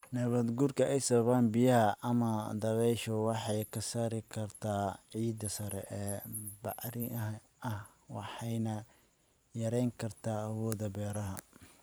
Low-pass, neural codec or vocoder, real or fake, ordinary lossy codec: none; vocoder, 44.1 kHz, 128 mel bands every 512 samples, BigVGAN v2; fake; none